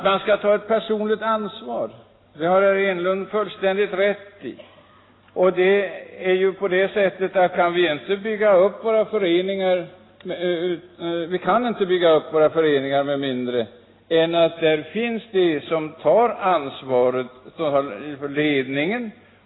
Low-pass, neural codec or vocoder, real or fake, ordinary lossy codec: 7.2 kHz; none; real; AAC, 16 kbps